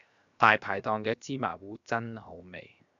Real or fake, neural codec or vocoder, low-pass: fake; codec, 16 kHz, 0.7 kbps, FocalCodec; 7.2 kHz